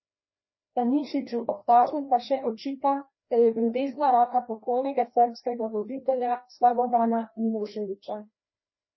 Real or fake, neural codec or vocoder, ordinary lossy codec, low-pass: fake; codec, 16 kHz, 1 kbps, FreqCodec, larger model; MP3, 24 kbps; 7.2 kHz